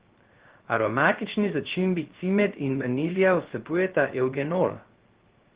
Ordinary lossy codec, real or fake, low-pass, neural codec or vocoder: Opus, 16 kbps; fake; 3.6 kHz; codec, 16 kHz, 0.3 kbps, FocalCodec